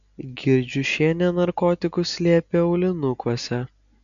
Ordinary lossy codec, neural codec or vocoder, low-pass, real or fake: MP3, 96 kbps; none; 7.2 kHz; real